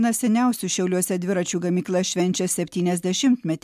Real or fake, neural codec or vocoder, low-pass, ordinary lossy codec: real; none; 14.4 kHz; MP3, 96 kbps